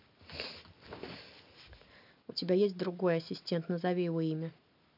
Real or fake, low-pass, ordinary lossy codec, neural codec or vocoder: real; 5.4 kHz; none; none